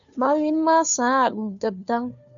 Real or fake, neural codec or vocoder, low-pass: fake; codec, 16 kHz, 2 kbps, FunCodec, trained on Chinese and English, 25 frames a second; 7.2 kHz